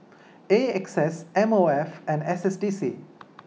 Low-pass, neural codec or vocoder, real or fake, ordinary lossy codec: none; none; real; none